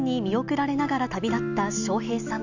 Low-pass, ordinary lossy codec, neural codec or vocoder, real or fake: 7.2 kHz; none; none; real